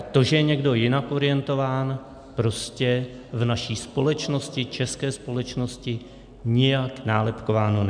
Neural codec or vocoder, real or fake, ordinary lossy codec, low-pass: none; real; MP3, 96 kbps; 9.9 kHz